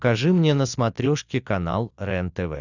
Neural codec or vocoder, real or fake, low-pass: none; real; 7.2 kHz